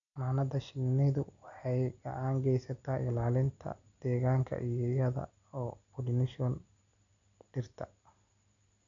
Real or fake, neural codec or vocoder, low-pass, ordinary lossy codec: real; none; 7.2 kHz; none